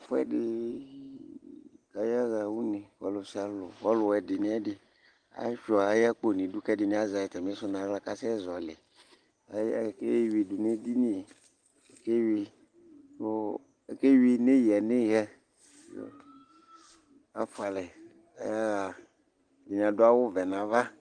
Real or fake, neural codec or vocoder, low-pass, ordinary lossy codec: real; none; 9.9 kHz; Opus, 24 kbps